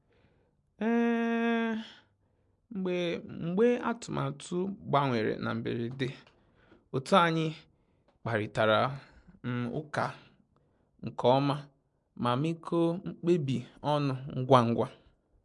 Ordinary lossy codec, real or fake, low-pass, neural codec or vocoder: MP3, 64 kbps; real; 10.8 kHz; none